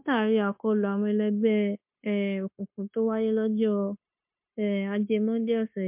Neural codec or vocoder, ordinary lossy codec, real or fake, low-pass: codec, 16 kHz, 0.9 kbps, LongCat-Audio-Codec; MP3, 32 kbps; fake; 3.6 kHz